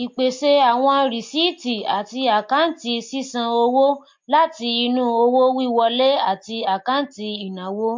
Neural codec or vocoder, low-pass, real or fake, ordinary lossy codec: none; 7.2 kHz; real; MP3, 48 kbps